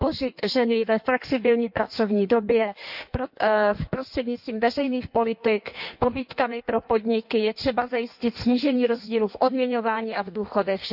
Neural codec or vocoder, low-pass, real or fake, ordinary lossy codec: codec, 16 kHz in and 24 kHz out, 1.1 kbps, FireRedTTS-2 codec; 5.4 kHz; fake; none